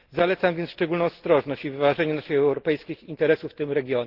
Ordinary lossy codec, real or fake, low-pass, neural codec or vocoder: Opus, 16 kbps; real; 5.4 kHz; none